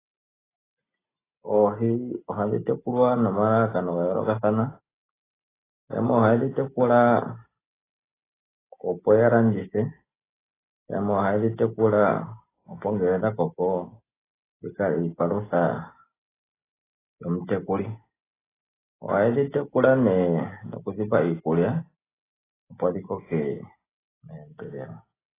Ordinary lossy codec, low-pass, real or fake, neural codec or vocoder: AAC, 16 kbps; 3.6 kHz; real; none